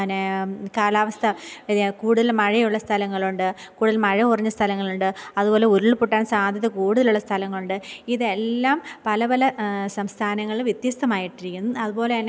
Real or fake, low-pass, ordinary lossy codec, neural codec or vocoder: real; none; none; none